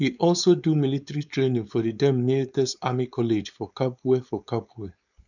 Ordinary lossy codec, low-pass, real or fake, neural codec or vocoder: none; 7.2 kHz; fake; codec, 16 kHz, 4.8 kbps, FACodec